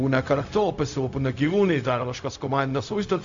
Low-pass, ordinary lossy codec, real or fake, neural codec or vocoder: 7.2 kHz; AAC, 64 kbps; fake; codec, 16 kHz, 0.4 kbps, LongCat-Audio-Codec